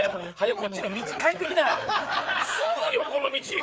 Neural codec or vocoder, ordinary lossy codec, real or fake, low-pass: codec, 16 kHz, 4 kbps, FreqCodec, larger model; none; fake; none